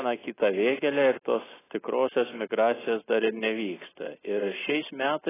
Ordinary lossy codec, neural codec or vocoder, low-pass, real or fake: AAC, 16 kbps; none; 3.6 kHz; real